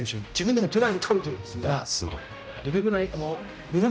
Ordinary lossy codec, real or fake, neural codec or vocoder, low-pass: none; fake; codec, 16 kHz, 0.5 kbps, X-Codec, HuBERT features, trained on general audio; none